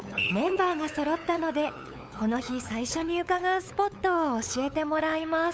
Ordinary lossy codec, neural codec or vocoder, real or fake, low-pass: none; codec, 16 kHz, 8 kbps, FunCodec, trained on LibriTTS, 25 frames a second; fake; none